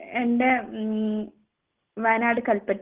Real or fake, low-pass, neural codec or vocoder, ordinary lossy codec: real; 3.6 kHz; none; Opus, 16 kbps